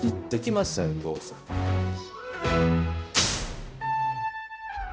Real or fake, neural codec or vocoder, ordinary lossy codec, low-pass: fake; codec, 16 kHz, 0.5 kbps, X-Codec, HuBERT features, trained on balanced general audio; none; none